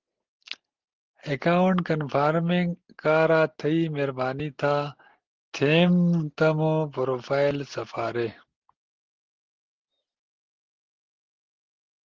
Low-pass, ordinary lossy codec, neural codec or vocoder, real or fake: 7.2 kHz; Opus, 16 kbps; none; real